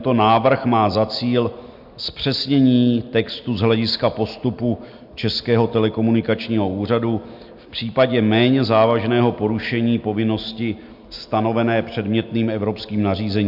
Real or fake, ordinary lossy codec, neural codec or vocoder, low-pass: real; MP3, 48 kbps; none; 5.4 kHz